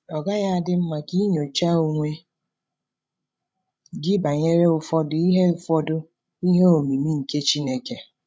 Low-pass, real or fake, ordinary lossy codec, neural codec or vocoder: none; fake; none; codec, 16 kHz, 16 kbps, FreqCodec, larger model